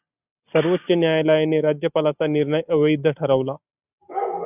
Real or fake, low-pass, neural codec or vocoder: real; 3.6 kHz; none